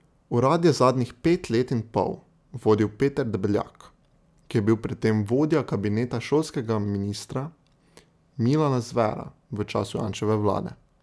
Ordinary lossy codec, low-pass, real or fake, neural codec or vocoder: none; none; real; none